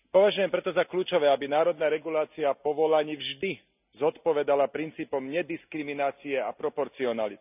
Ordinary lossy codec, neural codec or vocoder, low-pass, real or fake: none; none; 3.6 kHz; real